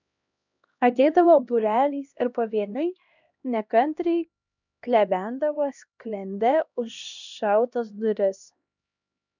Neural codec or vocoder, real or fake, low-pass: codec, 16 kHz, 1 kbps, X-Codec, HuBERT features, trained on LibriSpeech; fake; 7.2 kHz